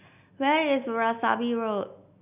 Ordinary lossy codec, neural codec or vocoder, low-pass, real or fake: none; none; 3.6 kHz; real